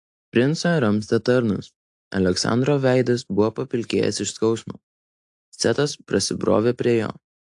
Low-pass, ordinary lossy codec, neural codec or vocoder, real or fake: 10.8 kHz; AAC, 64 kbps; none; real